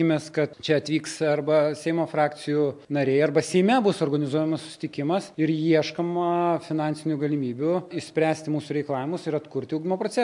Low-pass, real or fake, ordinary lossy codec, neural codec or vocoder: 10.8 kHz; real; MP3, 64 kbps; none